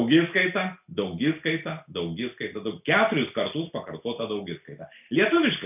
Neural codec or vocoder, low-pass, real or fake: none; 3.6 kHz; real